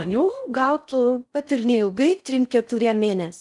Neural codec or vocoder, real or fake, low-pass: codec, 16 kHz in and 24 kHz out, 0.6 kbps, FocalCodec, streaming, 4096 codes; fake; 10.8 kHz